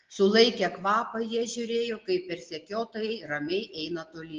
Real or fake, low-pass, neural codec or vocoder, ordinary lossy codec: real; 7.2 kHz; none; Opus, 16 kbps